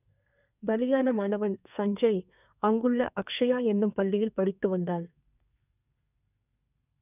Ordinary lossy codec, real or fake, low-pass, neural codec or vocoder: none; fake; 3.6 kHz; codec, 32 kHz, 1.9 kbps, SNAC